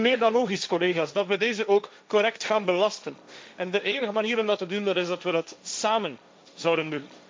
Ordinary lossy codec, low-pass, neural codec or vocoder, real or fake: none; none; codec, 16 kHz, 1.1 kbps, Voila-Tokenizer; fake